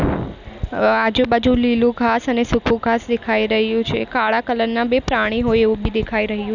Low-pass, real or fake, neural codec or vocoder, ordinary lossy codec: 7.2 kHz; real; none; none